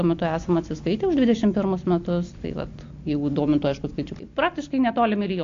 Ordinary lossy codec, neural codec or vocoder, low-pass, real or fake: AAC, 48 kbps; none; 7.2 kHz; real